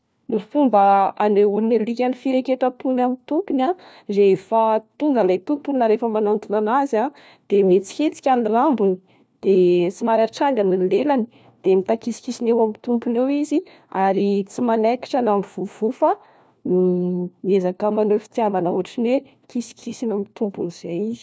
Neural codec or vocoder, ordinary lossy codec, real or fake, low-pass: codec, 16 kHz, 1 kbps, FunCodec, trained on LibriTTS, 50 frames a second; none; fake; none